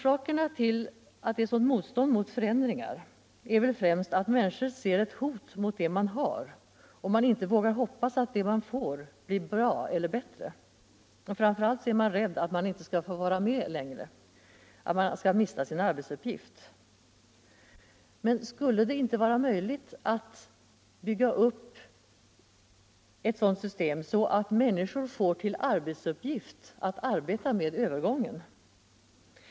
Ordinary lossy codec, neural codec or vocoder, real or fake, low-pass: none; none; real; none